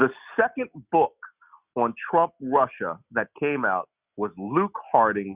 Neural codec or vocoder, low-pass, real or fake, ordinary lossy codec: none; 3.6 kHz; real; Opus, 64 kbps